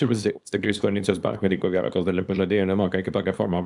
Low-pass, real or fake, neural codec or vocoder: 10.8 kHz; fake; codec, 24 kHz, 0.9 kbps, WavTokenizer, small release